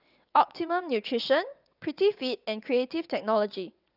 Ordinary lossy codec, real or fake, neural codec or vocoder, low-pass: none; fake; vocoder, 22.05 kHz, 80 mel bands, WaveNeXt; 5.4 kHz